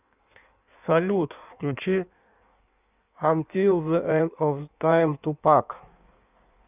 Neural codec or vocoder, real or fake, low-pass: codec, 16 kHz in and 24 kHz out, 1.1 kbps, FireRedTTS-2 codec; fake; 3.6 kHz